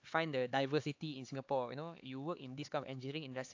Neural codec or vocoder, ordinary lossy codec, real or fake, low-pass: codec, 16 kHz, 4 kbps, X-Codec, HuBERT features, trained on LibriSpeech; AAC, 48 kbps; fake; 7.2 kHz